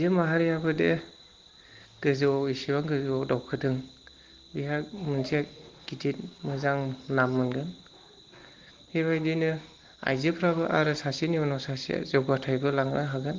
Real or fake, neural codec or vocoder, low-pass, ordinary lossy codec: real; none; 7.2 kHz; Opus, 16 kbps